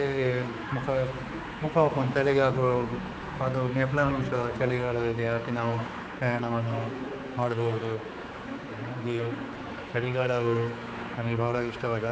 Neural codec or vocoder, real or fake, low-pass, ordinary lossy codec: codec, 16 kHz, 4 kbps, X-Codec, HuBERT features, trained on general audio; fake; none; none